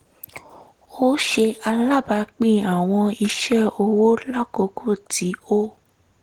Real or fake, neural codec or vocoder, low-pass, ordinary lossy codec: real; none; 19.8 kHz; Opus, 16 kbps